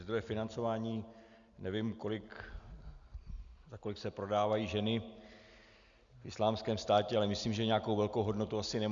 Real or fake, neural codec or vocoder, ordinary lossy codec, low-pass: real; none; Opus, 64 kbps; 7.2 kHz